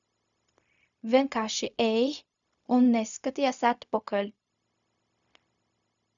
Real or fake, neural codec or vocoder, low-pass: fake; codec, 16 kHz, 0.4 kbps, LongCat-Audio-Codec; 7.2 kHz